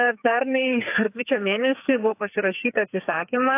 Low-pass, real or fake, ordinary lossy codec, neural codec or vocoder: 3.6 kHz; fake; AAC, 24 kbps; codec, 44.1 kHz, 2.6 kbps, SNAC